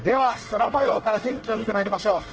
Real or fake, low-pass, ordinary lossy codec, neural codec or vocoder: fake; 7.2 kHz; Opus, 16 kbps; codec, 24 kHz, 1 kbps, SNAC